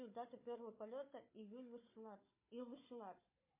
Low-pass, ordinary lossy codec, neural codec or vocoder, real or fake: 3.6 kHz; MP3, 24 kbps; codec, 16 kHz, 4 kbps, FunCodec, trained on Chinese and English, 50 frames a second; fake